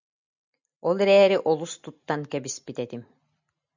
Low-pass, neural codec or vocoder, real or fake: 7.2 kHz; none; real